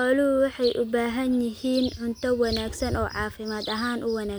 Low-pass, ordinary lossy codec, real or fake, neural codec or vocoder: none; none; real; none